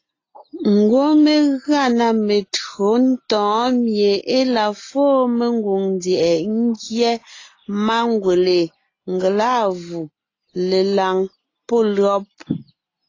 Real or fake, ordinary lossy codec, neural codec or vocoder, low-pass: real; AAC, 32 kbps; none; 7.2 kHz